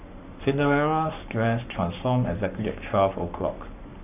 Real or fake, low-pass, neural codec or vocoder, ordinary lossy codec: fake; 3.6 kHz; codec, 44.1 kHz, 7.8 kbps, Pupu-Codec; none